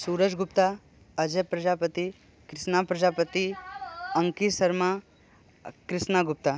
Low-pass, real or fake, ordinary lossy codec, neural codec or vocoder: none; real; none; none